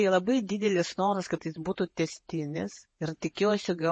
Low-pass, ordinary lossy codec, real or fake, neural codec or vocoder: 9.9 kHz; MP3, 32 kbps; fake; vocoder, 48 kHz, 128 mel bands, Vocos